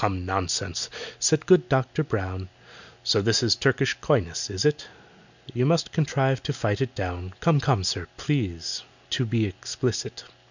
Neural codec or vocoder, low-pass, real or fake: none; 7.2 kHz; real